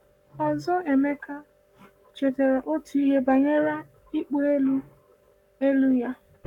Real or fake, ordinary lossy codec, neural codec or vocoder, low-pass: fake; none; codec, 44.1 kHz, 7.8 kbps, Pupu-Codec; 19.8 kHz